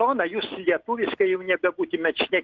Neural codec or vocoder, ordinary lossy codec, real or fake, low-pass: none; Opus, 16 kbps; real; 7.2 kHz